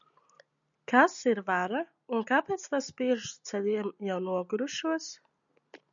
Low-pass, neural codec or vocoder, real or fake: 7.2 kHz; none; real